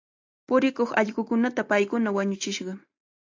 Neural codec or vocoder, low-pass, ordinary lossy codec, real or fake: none; 7.2 kHz; AAC, 48 kbps; real